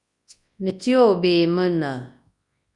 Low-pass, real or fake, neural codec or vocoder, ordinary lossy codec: 10.8 kHz; fake; codec, 24 kHz, 0.9 kbps, WavTokenizer, large speech release; Opus, 64 kbps